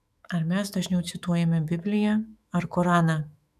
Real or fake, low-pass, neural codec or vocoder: fake; 14.4 kHz; autoencoder, 48 kHz, 128 numbers a frame, DAC-VAE, trained on Japanese speech